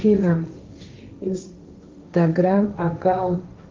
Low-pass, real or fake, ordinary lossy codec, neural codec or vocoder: 7.2 kHz; fake; Opus, 24 kbps; codec, 16 kHz, 1.1 kbps, Voila-Tokenizer